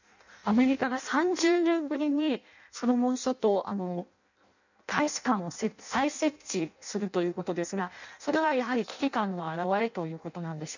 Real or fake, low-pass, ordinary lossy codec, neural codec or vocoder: fake; 7.2 kHz; none; codec, 16 kHz in and 24 kHz out, 0.6 kbps, FireRedTTS-2 codec